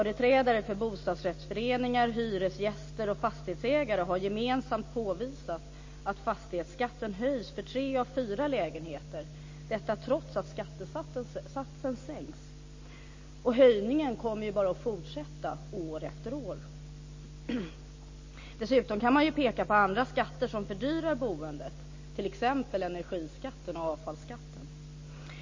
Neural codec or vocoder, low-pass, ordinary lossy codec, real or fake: none; 7.2 kHz; MP3, 32 kbps; real